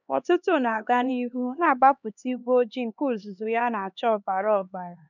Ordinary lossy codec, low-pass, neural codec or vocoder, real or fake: none; 7.2 kHz; codec, 16 kHz, 2 kbps, X-Codec, HuBERT features, trained on LibriSpeech; fake